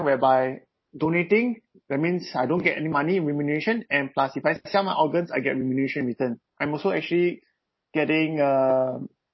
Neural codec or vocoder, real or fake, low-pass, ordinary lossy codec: none; real; 7.2 kHz; MP3, 24 kbps